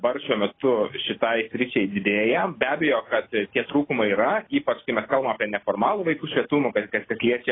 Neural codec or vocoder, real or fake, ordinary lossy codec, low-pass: none; real; AAC, 16 kbps; 7.2 kHz